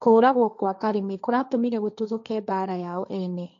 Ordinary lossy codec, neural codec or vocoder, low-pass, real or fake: none; codec, 16 kHz, 1.1 kbps, Voila-Tokenizer; 7.2 kHz; fake